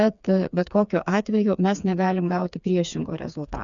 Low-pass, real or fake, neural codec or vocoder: 7.2 kHz; fake; codec, 16 kHz, 4 kbps, FreqCodec, smaller model